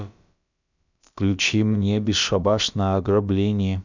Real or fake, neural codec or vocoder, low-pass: fake; codec, 16 kHz, about 1 kbps, DyCAST, with the encoder's durations; 7.2 kHz